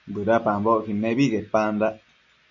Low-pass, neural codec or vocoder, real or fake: 7.2 kHz; none; real